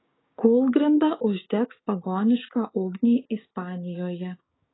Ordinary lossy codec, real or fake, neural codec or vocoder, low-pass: AAC, 16 kbps; fake; codec, 16 kHz, 6 kbps, DAC; 7.2 kHz